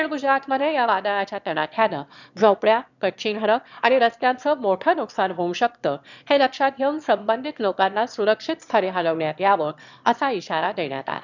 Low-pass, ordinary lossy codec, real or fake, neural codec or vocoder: 7.2 kHz; none; fake; autoencoder, 22.05 kHz, a latent of 192 numbers a frame, VITS, trained on one speaker